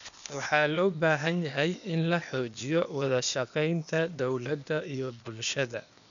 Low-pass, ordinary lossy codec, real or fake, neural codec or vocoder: 7.2 kHz; none; fake; codec, 16 kHz, 0.8 kbps, ZipCodec